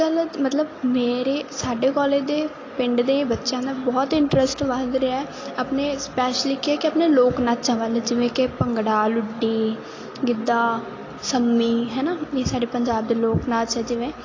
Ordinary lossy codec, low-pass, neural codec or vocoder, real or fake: AAC, 32 kbps; 7.2 kHz; none; real